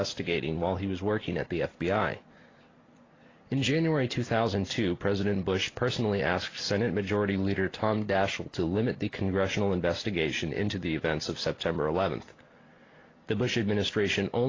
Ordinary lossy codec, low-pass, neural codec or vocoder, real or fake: AAC, 32 kbps; 7.2 kHz; none; real